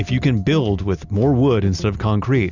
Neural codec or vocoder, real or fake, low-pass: none; real; 7.2 kHz